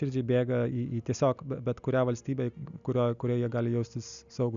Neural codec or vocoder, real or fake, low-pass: none; real; 7.2 kHz